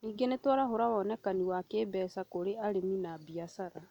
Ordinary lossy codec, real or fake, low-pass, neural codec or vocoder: none; real; 19.8 kHz; none